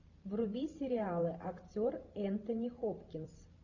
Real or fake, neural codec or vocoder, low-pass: fake; vocoder, 44.1 kHz, 128 mel bands every 512 samples, BigVGAN v2; 7.2 kHz